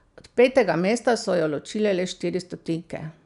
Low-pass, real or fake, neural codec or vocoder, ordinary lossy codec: 10.8 kHz; real; none; none